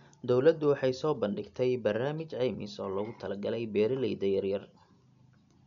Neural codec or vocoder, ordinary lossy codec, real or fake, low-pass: none; none; real; 7.2 kHz